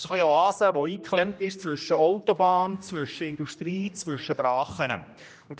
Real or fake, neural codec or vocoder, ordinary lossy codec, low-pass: fake; codec, 16 kHz, 1 kbps, X-Codec, HuBERT features, trained on general audio; none; none